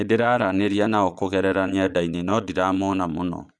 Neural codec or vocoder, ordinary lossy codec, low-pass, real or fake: vocoder, 22.05 kHz, 80 mel bands, Vocos; none; none; fake